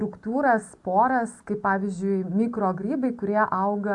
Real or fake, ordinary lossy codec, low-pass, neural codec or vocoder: real; AAC, 64 kbps; 10.8 kHz; none